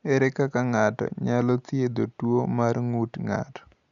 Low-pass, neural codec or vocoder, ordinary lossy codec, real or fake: 7.2 kHz; none; none; real